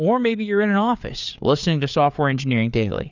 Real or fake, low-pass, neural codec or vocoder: fake; 7.2 kHz; codec, 16 kHz, 4 kbps, FreqCodec, larger model